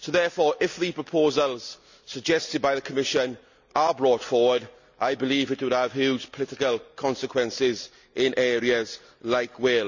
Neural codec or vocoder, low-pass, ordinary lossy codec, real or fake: none; 7.2 kHz; none; real